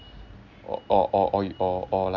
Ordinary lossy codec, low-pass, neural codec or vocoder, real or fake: MP3, 64 kbps; 7.2 kHz; none; real